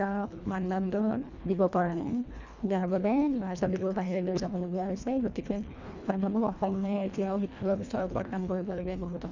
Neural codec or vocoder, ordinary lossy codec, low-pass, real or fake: codec, 24 kHz, 1.5 kbps, HILCodec; none; 7.2 kHz; fake